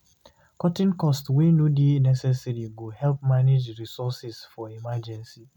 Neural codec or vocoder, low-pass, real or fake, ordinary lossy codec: none; 19.8 kHz; real; none